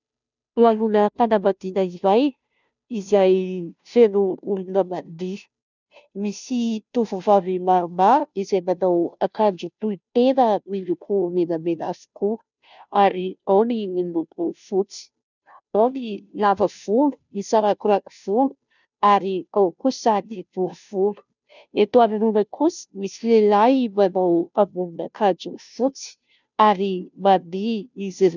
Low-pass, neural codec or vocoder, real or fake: 7.2 kHz; codec, 16 kHz, 0.5 kbps, FunCodec, trained on Chinese and English, 25 frames a second; fake